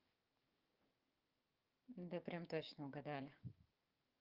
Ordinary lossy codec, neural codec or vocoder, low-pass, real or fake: Opus, 32 kbps; codec, 16 kHz, 6 kbps, DAC; 5.4 kHz; fake